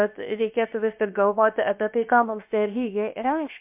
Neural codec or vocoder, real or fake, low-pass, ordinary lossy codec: codec, 16 kHz, 0.7 kbps, FocalCodec; fake; 3.6 kHz; MP3, 32 kbps